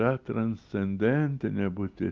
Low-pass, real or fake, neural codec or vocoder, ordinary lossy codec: 7.2 kHz; real; none; Opus, 24 kbps